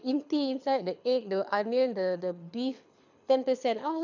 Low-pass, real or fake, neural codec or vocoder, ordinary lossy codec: 7.2 kHz; fake; codec, 24 kHz, 6 kbps, HILCodec; none